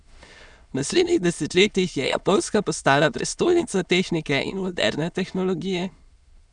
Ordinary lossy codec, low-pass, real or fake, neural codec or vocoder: none; 9.9 kHz; fake; autoencoder, 22.05 kHz, a latent of 192 numbers a frame, VITS, trained on many speakers